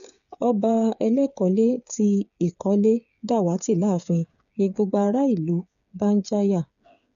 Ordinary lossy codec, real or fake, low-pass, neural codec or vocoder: none; fake; 7.2 kHz; codec, 16 kHz, 8 kbps, FreqCodec, smaller model